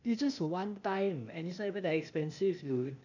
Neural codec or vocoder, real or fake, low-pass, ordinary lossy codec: codec, 16 kHz, 0.8 kbps, ZipCodec; fake; 7.2 kHz; none